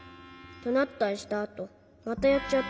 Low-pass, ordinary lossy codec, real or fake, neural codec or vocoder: none; none; real; none